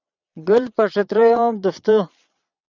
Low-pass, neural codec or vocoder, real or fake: 7.2 kHz; vocoder, 22.05 kHz, 80 mel bands, Vocos; fake